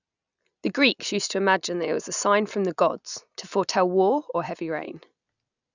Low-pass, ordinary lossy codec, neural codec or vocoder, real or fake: 7.2 kHz; none; none; real